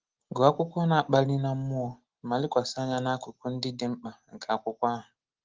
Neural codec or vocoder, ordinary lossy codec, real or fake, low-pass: none; Opus, 16 kbps; real; 7.2 kHz